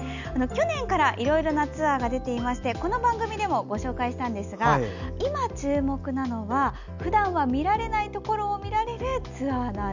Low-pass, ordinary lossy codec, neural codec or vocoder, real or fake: 7.2 kHz; none; none; real